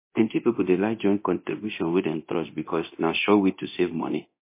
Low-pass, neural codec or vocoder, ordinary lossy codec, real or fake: 3.6 kHz; codec, 24 kHz, 0.9 kbps, DualCodec; MP3, 24 kbps; fake